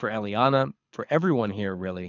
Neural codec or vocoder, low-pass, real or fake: codec, 24 kHz, 6 kbps, HILCodec; 7.2 kHz; fake